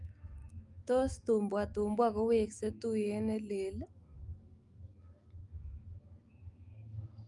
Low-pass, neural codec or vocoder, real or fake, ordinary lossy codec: 10.8 kHz; autoencoder, 48 kHz, 128 numbers a frame, DAC-VAE, trained on Japanese speech; fake; Opus, 32 kbps